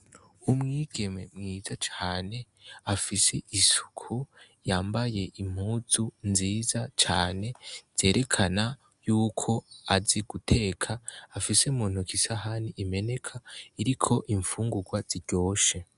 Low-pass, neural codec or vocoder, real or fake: 10.8 kHz; none; real